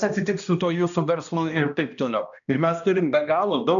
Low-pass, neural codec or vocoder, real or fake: 7.2 kHz; codec, 16 kHz, 1 kbps, X-Codec, HuBERT features, trained on balanced general audio; fake